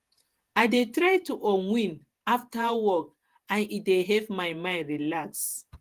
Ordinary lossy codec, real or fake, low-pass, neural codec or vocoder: Opus, 24 kbps; fake; 14.4 kHz; vocoder, 48 kHz, 128 mel bands, Vocos